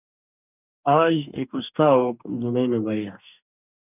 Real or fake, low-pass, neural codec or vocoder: fake; 3.6 kHz; codec, 44.1 kHz, 2.6 kbps, DAC